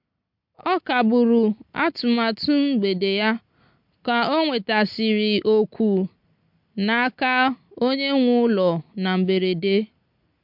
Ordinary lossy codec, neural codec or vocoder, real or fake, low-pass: MP3, 48 kbps; none; real; 5.4 kHz